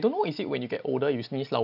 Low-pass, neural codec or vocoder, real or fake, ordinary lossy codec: 5.4 kHz; none; real; none